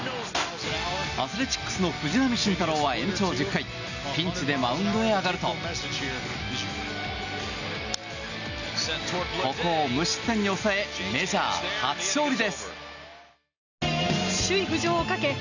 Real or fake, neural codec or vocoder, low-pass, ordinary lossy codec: real; none; 7.2 kHz; none